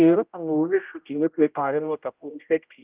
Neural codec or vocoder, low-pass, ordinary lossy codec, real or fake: codec, 16 kHz, 0.5 kbps, X-Codec, HuBERT features, trained on general audio; 3.6 kHz; Opus, 24 kbps; fake